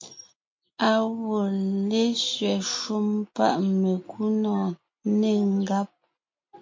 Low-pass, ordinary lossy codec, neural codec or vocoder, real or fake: 7.2 kHz; MP3, 48 kbps; none; real